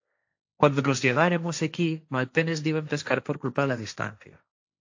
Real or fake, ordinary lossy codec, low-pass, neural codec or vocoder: fake; AAC, 48 kbps; 7.2 kHz; codec, 16 kHz, 1.1 kbps, Voila-Tokenizer